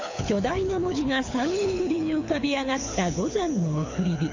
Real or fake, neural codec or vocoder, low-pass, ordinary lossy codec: fake; codec, 24 kHz, 6 kbps, HILCodec; 7.2 kHz; AAC, 48 kbps